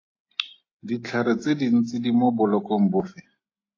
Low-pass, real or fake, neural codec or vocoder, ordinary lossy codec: 7.2 kHz; real; none; AAC, 32 kbps